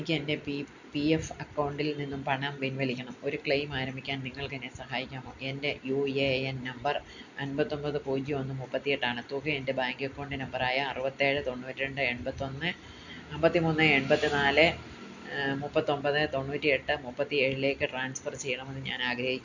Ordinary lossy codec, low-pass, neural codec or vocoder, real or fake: none; 7.2 kHz; none; real